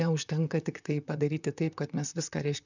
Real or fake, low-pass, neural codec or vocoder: fake; 7.2 kHz; vocoder, 44.1 kHz, 128 mel bands, Pupu-Vocoder